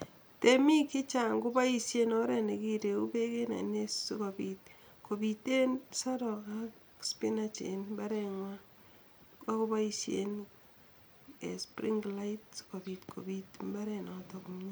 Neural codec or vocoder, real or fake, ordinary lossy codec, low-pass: none; real; none; none